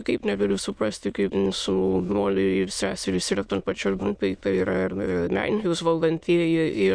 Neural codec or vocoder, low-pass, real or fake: autoencoder, 22.05 kHz, a latent of 192 numbers a frame, VITS, trained on many speakers; 9.9 kHz; fake